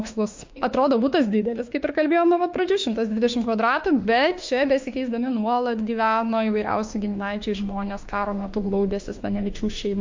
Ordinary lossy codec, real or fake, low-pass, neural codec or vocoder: MP3, 48 kbps; fake; 7.2 kHz; autoencoder, 48 kHz, 32 numbers a frame, DAC-VAE, trained on Japanese speech